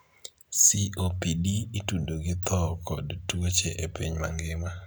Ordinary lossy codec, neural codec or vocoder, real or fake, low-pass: none; none; real; none